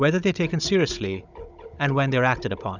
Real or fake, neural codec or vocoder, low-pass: fake; codec, 16 kHz, 16 kbps, FunCodec, trained on Chinese and English, 50 frames a second; 7.2 kHz